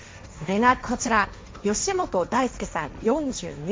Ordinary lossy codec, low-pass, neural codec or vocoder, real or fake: none; none; codec, 16 kHz, 1.1 kbps, Voila-Tokenizer; fake